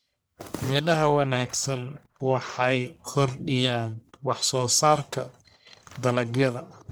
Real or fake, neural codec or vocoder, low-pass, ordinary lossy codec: fake; codec, 44.1 kHz, 1.7 kbps, Pupu-Codec; none; none